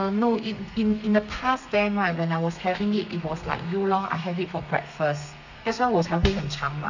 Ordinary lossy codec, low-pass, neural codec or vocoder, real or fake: none; 7.2 kHz; codec, 32 kHz, 1.9 kbps, SNAC; fake